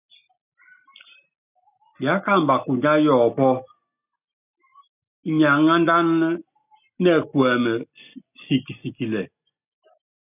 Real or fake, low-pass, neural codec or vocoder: real; 3.6 kHz; none